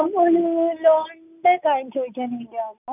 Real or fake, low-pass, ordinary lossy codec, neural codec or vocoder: real; 3.6 kHz; none; none